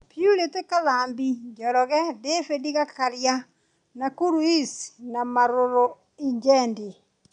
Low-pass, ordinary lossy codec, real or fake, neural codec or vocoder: 9.9 kHz; none; real; none